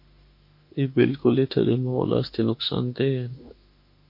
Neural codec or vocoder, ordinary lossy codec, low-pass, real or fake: autoencoder, 48 kHz, 32 numbers a frame, DAC-VAE, trained on Japanese speech; MP3, 32 kbps; 5.4 kHz; fake